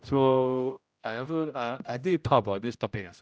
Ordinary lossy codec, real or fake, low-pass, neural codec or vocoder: none; fake; none; codec, 16 kHz, 0.5 kbps, X-Codec, HuBERT features, trained on general audio